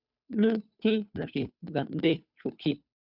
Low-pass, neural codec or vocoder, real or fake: 5.4 kHz; codec, 16 kHz, 2 kbps, FunCodec, trained on Chinese and English, 25 frames a second; fake